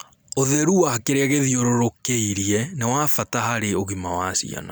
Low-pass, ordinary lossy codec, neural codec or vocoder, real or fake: none; none; none; real